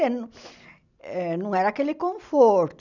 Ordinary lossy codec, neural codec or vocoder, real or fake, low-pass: none; none; real; 7.2 kHz